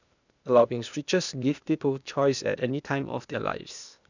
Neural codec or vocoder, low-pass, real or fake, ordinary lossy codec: codec, 16 kHz, 0.8 kbps, ZipCodec; 7.2 kHz; fake; none